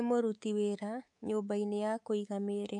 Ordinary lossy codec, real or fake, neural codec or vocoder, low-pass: MP3, 64 kbps; fake; codec, 24 kHz, 3.1 kbps, DualCodec; 10.8 kHz